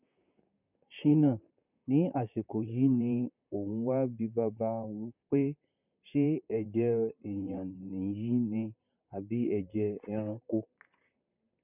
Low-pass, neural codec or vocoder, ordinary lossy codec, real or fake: 3.6 kHz; vocoder, 44.1 kHz, 128 mel bands, Pupu-Vocoder; none; fake